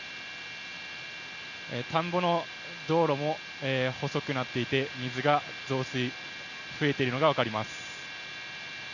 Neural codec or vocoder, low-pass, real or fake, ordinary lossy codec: none; 7.2 kHz; real; none